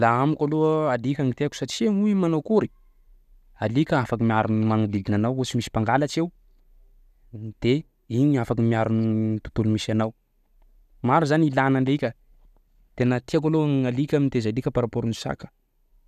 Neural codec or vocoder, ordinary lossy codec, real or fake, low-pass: none; none; real; 14.4 kHz